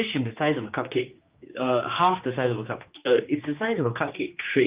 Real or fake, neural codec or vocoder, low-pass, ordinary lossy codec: fake; codec, 16 kHz, 2 kbps, X-Codec, HuBERT features, trained on general audio; 3.6 kHz; Opus, 24 kbps